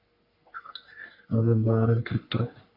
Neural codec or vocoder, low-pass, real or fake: codec, 44.1 kHz, 3.4 kbps, Pupu-Codec; 5.4 kHz; fake